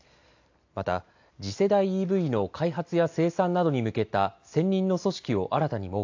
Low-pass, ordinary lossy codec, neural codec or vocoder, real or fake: 7.2 kHz; AAC, 48 kbps; none; real